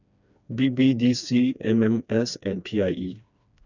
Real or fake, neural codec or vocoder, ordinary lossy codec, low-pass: fake; codec, 16 kHz, 2 kbps, FreqCodec, smaller model; none; 7.2 kHz